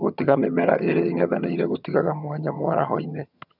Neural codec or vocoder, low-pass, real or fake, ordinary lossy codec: vocoder, 22.05 kHz, 80 mel bands, HiFi-GAN; 5.4 kHz; fake; none